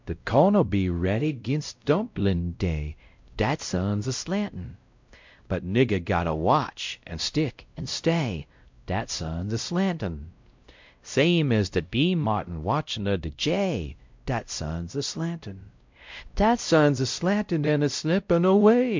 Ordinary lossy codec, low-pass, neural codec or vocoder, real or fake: MP3, 64 kbps; 7.2 kHz; codec, 16 kHz, 0.5 kbps, X-Codec, WavLM features, trained on Multilingual LibriSpeech; fake